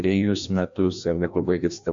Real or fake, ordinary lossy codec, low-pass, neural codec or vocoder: fake; MP3, 64 kbps; 7.2 kHz; codec, 16 kHz, 1 kbps, FreqCodec, larger model